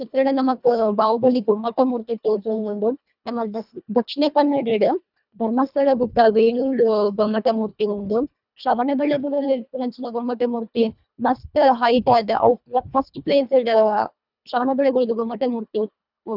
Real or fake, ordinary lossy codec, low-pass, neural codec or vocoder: fake; none; 5.4 kHz; codec, 24 kHz, 1.5 kbps, HILCodec